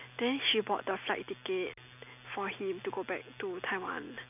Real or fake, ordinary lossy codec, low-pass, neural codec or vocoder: real; none; 3.6 kHz; none